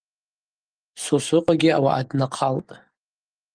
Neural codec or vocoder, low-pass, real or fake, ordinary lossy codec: codec, 44.1 kHz, 7.8 kbps, DAC; 9.9 kHz; fake; Opus, 24 kbps